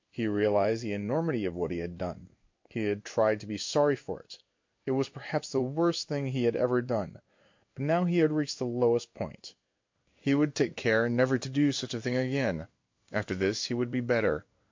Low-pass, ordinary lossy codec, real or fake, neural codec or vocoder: 7.2 kHz; MP3, 48 kbps; fake; codec, 16 kHz in and 24 kHz out, 1 kbps, XY-Tokenizer